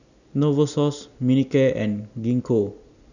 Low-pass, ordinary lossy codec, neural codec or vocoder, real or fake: 7.2 kHz; none; none; real